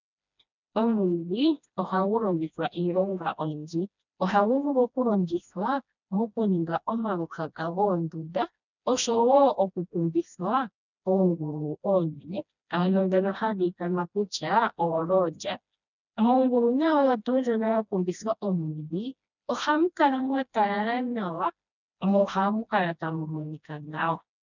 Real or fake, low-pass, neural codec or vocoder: fake; 7.2 kHz; codec, 16 kHz, 1 kbps, FreqCodec, smaller model